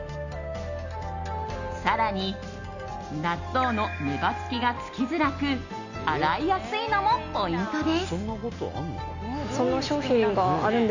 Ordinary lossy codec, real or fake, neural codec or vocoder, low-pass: Opus, 64 kbps; real; none; 7.2 kHz